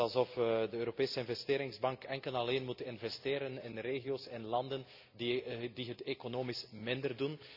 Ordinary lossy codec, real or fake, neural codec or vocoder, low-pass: none; real; none; 5.4 kHz